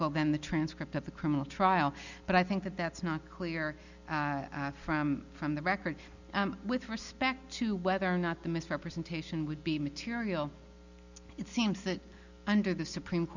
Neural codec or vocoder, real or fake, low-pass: none; real; 7.2 kHz